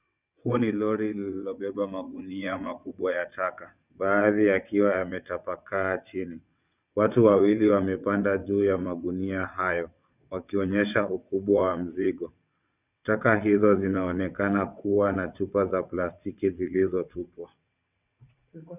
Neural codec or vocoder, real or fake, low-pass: vocoder, 22.05 kHz, 80 mel bands, WaveNeXt; fake; 3.6 kHz